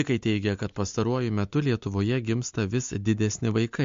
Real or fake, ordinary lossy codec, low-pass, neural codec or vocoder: real; MP3, 48 kbps; 7.2 kHz; none